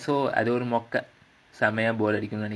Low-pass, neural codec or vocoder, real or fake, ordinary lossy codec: none; none; real; none